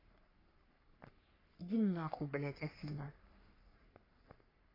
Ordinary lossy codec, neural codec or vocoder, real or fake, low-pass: AAC, 24 kbps; codec, 44.1 kHz, 3.4 kbps, Pupu-Codec; fake; 5.4 kHz